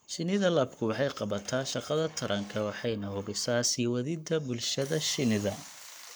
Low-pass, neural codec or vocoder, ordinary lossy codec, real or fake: none; codec, 44.1 kHz, 7.8 kbps, Pupu-Codec; none; fake